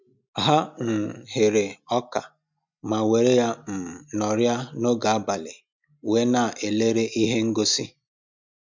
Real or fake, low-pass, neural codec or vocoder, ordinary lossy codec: real; 7.2 kHz; none; MP3, 64 kbps